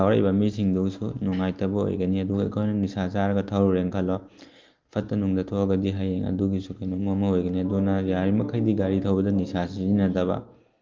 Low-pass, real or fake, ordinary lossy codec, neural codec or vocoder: 7.2 kHz; real; Opus, 32 kbps; none